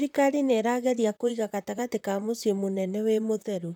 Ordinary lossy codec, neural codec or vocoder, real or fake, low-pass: none; vocoder, 44.1 kHz, 128 mel bands, Pupu-Vocoder; fake; 19.8 kHz